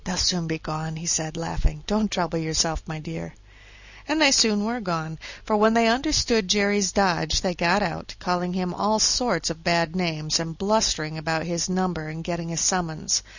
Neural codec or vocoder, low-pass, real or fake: none; 7.2 kHz; real